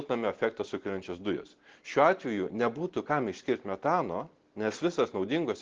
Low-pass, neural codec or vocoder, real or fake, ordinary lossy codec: 7.2 kHz; none; real; Opus, 16 kbps